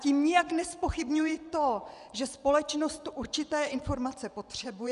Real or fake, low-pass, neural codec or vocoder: fake; 10.8 kHz; vocoder, 24 kHz, 100 mel bands, Vocos